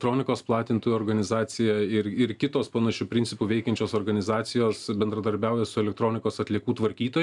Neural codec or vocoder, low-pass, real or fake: none; 10.8 kHz; real